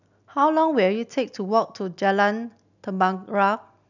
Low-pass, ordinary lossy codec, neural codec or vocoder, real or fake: 7.2 kHz; none; none; real